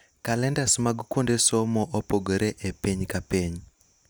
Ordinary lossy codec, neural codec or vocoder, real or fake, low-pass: none; none; real; none